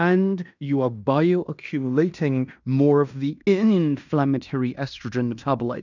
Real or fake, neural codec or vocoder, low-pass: fake; codec, 16 kHz in and 24 kHz out, 0.9 kbps, LongCat-Audio-Codec, fine tuned four codebook decoder; 7.2 kHz